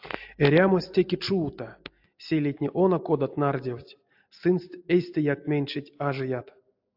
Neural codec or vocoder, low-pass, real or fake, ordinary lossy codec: none; 5.4 kHz; real; AAC, 48 kbps